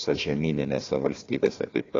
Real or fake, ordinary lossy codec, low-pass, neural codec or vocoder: fake; AAC, 32 kbps; 7.2 kHz; codec, 16 kHz, 1 kbps, FunCodec, trained on Chinese and English, 50 frames a second